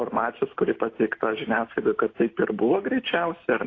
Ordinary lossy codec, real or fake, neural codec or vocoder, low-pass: AAC, 32 kbps; real; none; 7.2 kHz